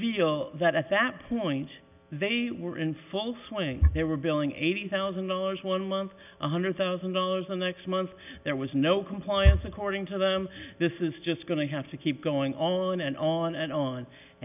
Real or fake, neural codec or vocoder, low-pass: real; none; 3.6 kHz